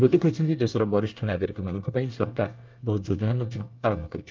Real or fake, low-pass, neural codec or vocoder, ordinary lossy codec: fake; 7.2 kHz; codec, 24 kHz, 1 kbps, SNAC; Opus, 24 kbps